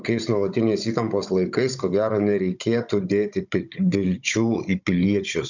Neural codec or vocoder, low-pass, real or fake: codec, 16 kHz, 16 kbps, FunCodec, trained on Chinese and English, 50 frames a second; 7.2 kHz; fake